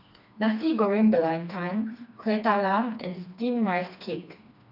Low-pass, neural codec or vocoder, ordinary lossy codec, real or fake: 5.4 kHz; codec, 16 kHz, 2 kbps, FreqCodec, smaller model; none; fake